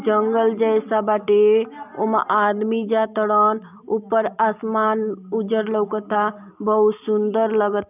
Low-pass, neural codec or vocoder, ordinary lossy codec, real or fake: 3.6 kHz; none; none; real